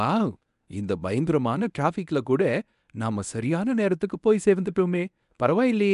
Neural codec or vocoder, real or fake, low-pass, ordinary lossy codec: codec, 24 kHz, 0.9 kbps, WavTokenizer, medium speech release version 1; fake; 10.8 kHz; none